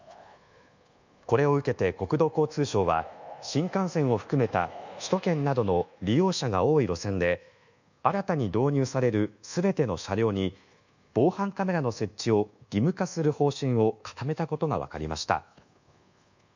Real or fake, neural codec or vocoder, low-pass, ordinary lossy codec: fake; codec, 24 kHz, 1.2 kbps, DualCodec; 7.2 kHz; none